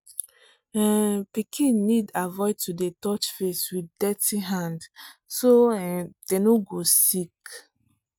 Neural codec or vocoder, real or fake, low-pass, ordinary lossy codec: none; real; none; none